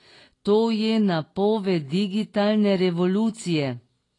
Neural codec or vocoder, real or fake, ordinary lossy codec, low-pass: none; real; AAC, 32 kbps; 10.8 kHz